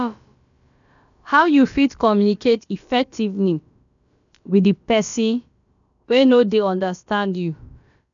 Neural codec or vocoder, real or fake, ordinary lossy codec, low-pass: codec, 16 kHz, about 1 kbps, DyCAST, with the encoder's durations; fake; none; 7.2 kHz